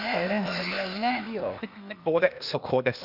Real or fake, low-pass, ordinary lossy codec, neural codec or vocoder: fake; 5.4 kHz; none; codec, 16 kHz, 0.8 kbps, ZipCodec